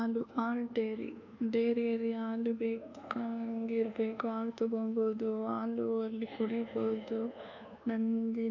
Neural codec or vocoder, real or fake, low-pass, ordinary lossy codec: codec, 24 kHz, 1.2 kbps, DualCodec; fake; 7.2 kHz; none